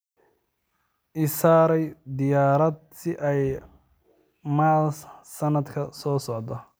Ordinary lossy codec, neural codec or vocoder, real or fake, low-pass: none; none; real; none